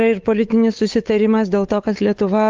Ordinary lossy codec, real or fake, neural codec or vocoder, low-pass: Opus, 32 kbps; fake; codec, 16 kHz, 8 kbps, FunCodec, trained on Chinese and English, 25 frames a second; 7.2 kHz